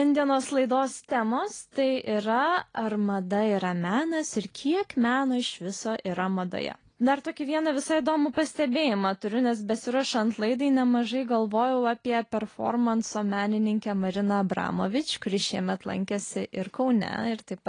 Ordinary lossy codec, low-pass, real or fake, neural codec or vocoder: AAC, 32 kbps; 9.9 kHz; real; none